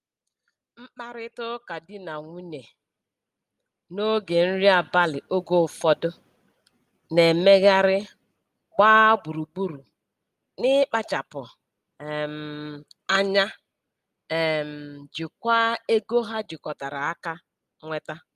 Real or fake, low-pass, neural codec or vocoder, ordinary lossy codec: real; 14.4 kHz; none; Opus, 32 kbps